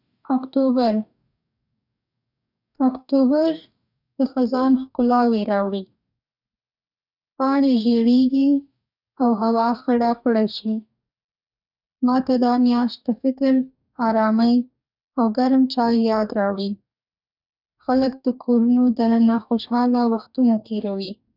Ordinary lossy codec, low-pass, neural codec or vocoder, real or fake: none; 5.4 kHz; codec, 44.1 kHz, 2.6 kbps, DAC; fake